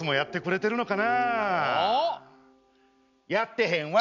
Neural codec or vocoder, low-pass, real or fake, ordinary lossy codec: none; 7.2 kHz; real; none